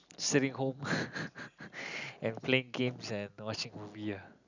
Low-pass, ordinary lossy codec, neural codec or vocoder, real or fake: 7.2 kHz; none; none; real